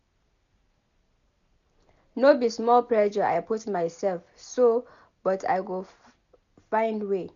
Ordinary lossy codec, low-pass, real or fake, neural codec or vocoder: none; 7.2 kHz; real; none